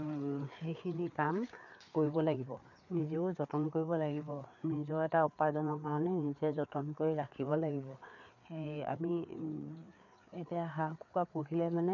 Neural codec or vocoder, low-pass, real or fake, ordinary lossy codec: codec, 16 kHz, 4 kbps, FreqCodec, larger model; 7.2 kHz; fake; none